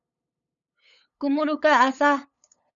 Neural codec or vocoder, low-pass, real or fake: codec, 16 kHz, 8 kbps, FunCodec, trained on LibriTTS, 25 frames a second; 7.2 kHz; fake